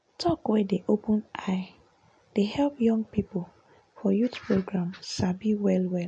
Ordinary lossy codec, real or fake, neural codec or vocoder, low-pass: MP3, 48 kbps; real; none; 9.9 kHz